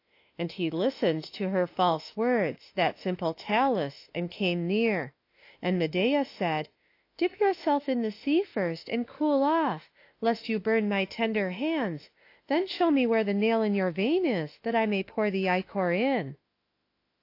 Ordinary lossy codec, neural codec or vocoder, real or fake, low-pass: AAC, 32 kbps; autoencoder, 48 kHz, 32 numbers a frame, DAC-VAE, trained on Japanese speech; fake; 5.4 kHz